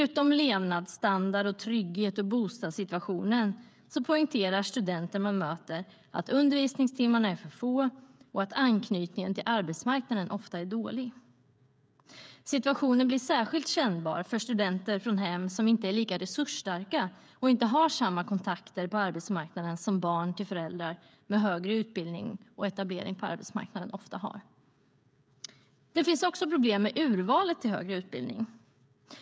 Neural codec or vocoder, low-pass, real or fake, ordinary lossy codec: codec, 16 kHz, 16 kbps, FreqCodec, smaller model; none; fake; none